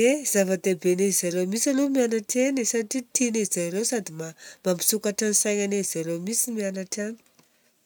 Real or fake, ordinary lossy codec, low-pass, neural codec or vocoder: real; none; none; none